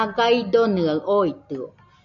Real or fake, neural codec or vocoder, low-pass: real; none; 7.2 kHz